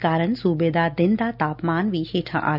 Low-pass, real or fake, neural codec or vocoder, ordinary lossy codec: 5.4 kHz; real; none; none